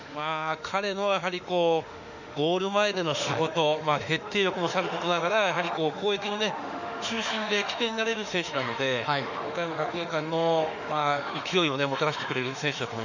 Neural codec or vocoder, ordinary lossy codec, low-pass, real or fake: autoencoder, 48 kHz, 32 numbers a frame, DAC-VAE, trained on Japanese speech; none; 7.2 kHz; fake